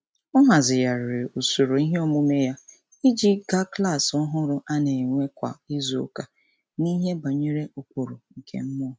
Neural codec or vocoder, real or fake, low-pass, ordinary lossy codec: none; real; none; none